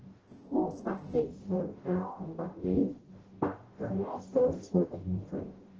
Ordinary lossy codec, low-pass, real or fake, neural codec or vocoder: Opus, 16 kbps; 7.2 kHz; fake; codec, 44.1 kHz, 0.9 kbps, DAC